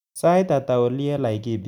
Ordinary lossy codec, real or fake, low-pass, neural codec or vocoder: none; real; 19.8 kHz; none